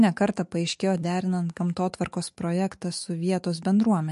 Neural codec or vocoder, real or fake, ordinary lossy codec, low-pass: autoencoder, 48 kHz, 128 numbers a frame, DAC-VAE, trained on Japanese speech; fake; MP3, 48 kbps; 14.4 kHz